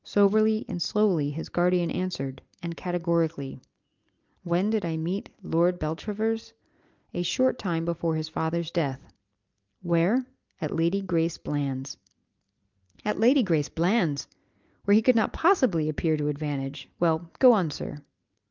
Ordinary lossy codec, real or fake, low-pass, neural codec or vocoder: Opus, 24 kbps; real; 7.2 kHz; none